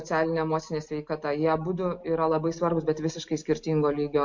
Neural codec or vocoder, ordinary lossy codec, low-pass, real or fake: none; MP3, 48 kbps; 7.2 kHz; real